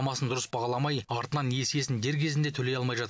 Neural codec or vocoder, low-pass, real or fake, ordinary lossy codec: none; none; real; none